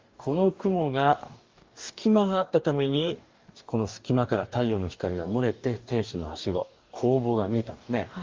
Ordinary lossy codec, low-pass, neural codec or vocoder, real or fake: Opus, 32 kbps; 7.2 kHz; codec, 44.1 kHz, 2.6 kbps, DAC; fake